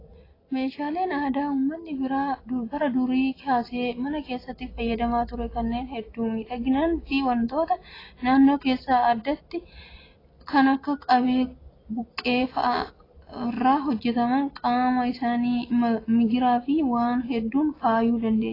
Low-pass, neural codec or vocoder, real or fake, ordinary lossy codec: 5.4 kHz; none; real; AAC, 24 kbps